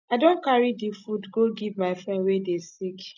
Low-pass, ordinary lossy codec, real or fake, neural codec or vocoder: 7.2 kHz; none; real; none